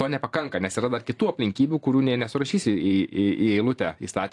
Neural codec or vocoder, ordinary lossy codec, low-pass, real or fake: vocoder, 44.1 kHz, 128 mel bands every 512 samples, BigVGAN v2; AAC, 48 kbps; 10.8 kHz; fake